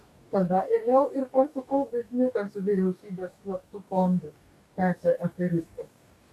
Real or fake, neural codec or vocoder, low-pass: fake; codec, 44.1 kHz, 2.6 kbps, DAC; 14.4 kHz